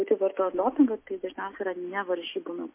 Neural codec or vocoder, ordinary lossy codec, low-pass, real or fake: none; MP3, 24 kbps; 3.6 kHz; real